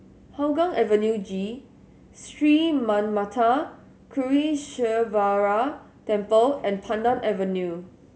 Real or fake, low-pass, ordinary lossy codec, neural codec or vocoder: real; none; none; none